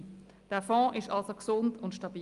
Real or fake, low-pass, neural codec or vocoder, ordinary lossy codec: real; 10.8 kHz; none; Opus, 32 kbps